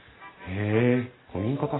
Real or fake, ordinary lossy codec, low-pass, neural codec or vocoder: real; AAC, 16 kbps; 7.2 kHz; none